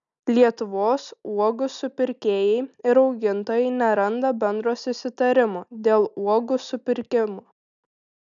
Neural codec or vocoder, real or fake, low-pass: none; real; 7.2 kHz